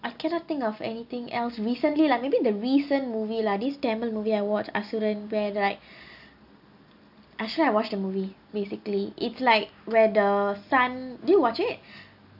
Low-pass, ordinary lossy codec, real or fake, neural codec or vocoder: 5.4 kHz; none; real; none